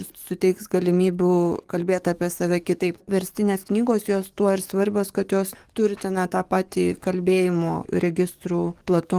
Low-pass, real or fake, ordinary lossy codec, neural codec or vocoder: 14.4 kHz; fake; Opus, 24 kbps; codec, 44.1 kHz, 7.8 kbps, DAC